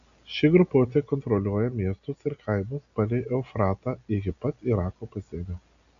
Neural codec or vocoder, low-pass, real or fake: none; 7.2 kHz; real